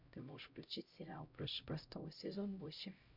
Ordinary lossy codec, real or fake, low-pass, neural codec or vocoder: MP3, 48 kbps; fake; 5.4 kHz; codec, 16 kHz, 0.5 kbps, X-Codec, HuBERT features, trained on LibriSpeech